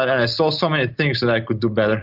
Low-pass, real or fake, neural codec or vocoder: 5.4 kHz; real; none